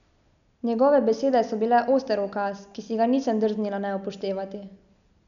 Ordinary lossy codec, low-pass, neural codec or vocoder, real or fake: none; 7.2 kHz; none; real